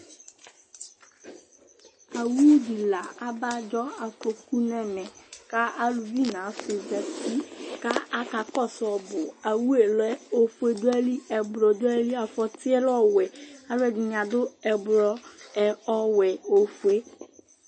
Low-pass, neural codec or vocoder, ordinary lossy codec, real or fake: 9.9 kHz; none; MP3, 32 kbps; real